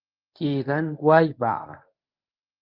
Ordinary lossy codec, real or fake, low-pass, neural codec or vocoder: Opus, 32 kbps; fake; 5.4 kHz; codec, 24 kHz, 0.9 kbps, WavTokenizer, medium speech release version 1